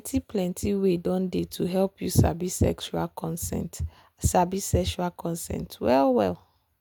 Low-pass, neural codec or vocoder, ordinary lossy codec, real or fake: none; none; none; real